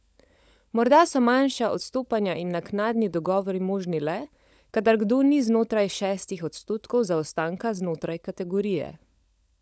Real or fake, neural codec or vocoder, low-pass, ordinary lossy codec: fake; codec, 16 kHz, 16 kbps, FunCodec, trained on LibriTTS, 50 frames a second; none; none